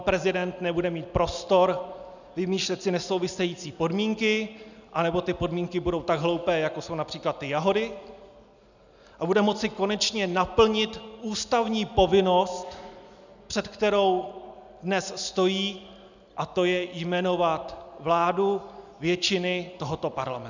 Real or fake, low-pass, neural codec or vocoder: real; 7.2 kHz; none